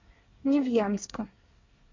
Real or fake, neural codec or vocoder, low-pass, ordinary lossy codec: fake; codec, 24 kHz, 1 kbps, SNAC; 7.2 kHz; MP3, 48 kbps